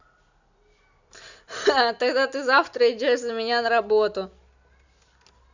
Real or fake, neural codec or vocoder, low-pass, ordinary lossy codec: real; none; 7.2 kHz; none